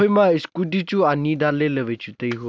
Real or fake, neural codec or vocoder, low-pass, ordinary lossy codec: real; none; none; none